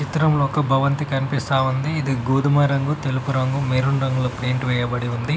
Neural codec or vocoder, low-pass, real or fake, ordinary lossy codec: none; none; real; none